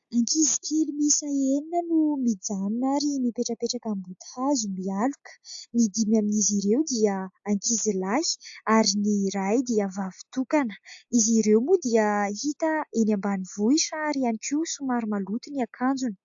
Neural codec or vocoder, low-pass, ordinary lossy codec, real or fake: none; 7.2 kHz; AAC, 64 kbps; real